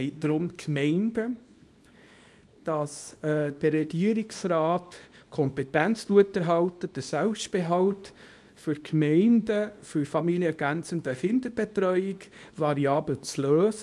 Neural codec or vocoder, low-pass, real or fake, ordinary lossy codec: codec, 24 kHz, 0.9 kbps, WavTokenizer, small release; none; fake; none